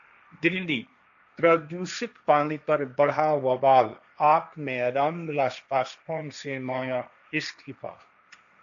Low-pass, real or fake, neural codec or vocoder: 7.2 kHz; fake; codec, 16 kHz, 1.1 kbps, Voila-Tokenizer